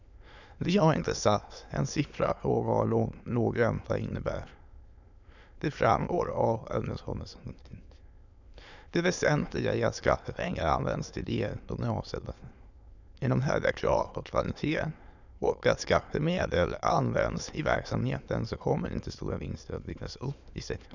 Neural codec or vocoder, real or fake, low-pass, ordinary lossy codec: autoencoder, 22.05 kHz, a latent of 192 numbers a frame, VITS, trained on many speakers; fake; 7.2 kHz; none